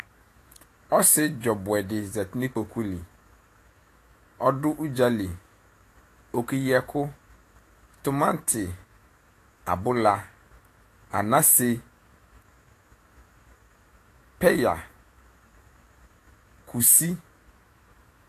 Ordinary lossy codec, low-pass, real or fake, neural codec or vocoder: AAC, 48 kbps; 14.4 kHz; fake; autoencoder, 48 kHz, 128 numbers a frame, DAC-VAE, trained on Japanese speech